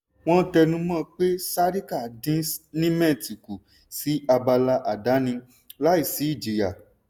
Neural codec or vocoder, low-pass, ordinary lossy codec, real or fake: none; none; none; real